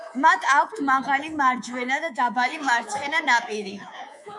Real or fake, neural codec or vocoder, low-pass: fake; codec, 24 kHz, 3.1 kbps, DualCodec; 10.8 kHz